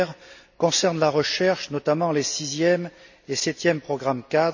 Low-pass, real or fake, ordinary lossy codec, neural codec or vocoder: 7.2 kHz; real; none; none